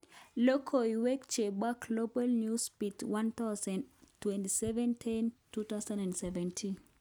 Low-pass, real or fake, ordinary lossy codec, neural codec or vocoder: none; real; none; none